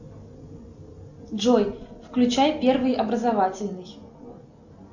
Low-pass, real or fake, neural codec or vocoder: 7.2 kHz; real; none